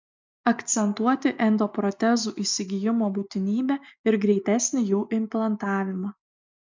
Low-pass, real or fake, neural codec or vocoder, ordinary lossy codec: 7.2 kHz; real; none; MP3, 64 kbps